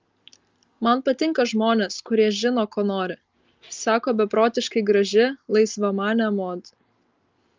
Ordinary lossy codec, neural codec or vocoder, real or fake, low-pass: Opus, 32 kbps; none; real; 7.2 kHz